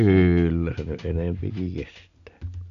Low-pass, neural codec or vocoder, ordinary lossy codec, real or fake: 7.2 kHz; none; none; real